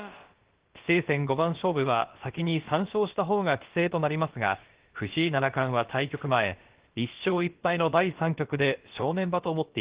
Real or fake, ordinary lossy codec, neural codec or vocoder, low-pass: fake; Opus, 16 kbps; codec, 16 kHz, about 1 kbps, DyCAST, with the encoder's durations; 3.6 kHz